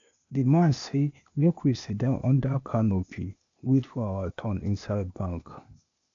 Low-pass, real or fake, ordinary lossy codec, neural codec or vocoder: 7.2 kHz; fake; MP3, 64 kbps; codec, 16 kHz, 0.8 kbps, ZipCodec